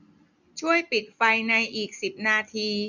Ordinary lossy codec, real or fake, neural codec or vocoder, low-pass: none; real; none; 7.2 kHz